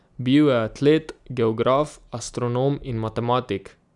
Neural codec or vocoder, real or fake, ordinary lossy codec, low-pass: none; real; none; 10.8 kHz